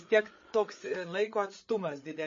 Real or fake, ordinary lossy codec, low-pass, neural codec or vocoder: fake; MP3, 32 kbps; 7.2 kHz; codec, 16 kHz, 8 kbps, FreqCodec, larger model